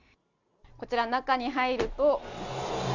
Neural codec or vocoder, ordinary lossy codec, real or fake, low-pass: none; none; real; 7.2 kHz